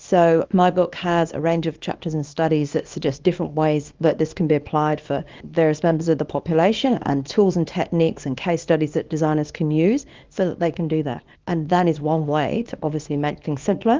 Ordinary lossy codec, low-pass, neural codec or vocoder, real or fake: Opus, 32 kbps; 7.2 kHz; codec, 24 kHz, 0.9 kbps, WavTokenizer, small release; fake